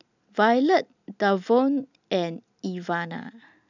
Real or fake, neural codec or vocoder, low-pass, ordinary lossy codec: real; none; 7.2 kHz; none